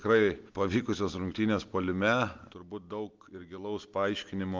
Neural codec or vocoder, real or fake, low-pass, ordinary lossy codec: none; real; 7.2 kHz; Opus, 32 kbps